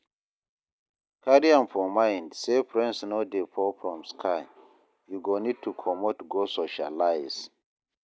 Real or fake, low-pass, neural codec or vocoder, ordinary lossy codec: real; none; none; none